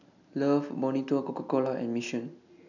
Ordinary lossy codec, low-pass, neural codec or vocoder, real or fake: none; 7.2 kHz; none; real